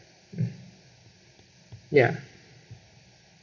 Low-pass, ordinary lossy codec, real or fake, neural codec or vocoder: 7.2 kHz; MP3, 64 kbps; real; none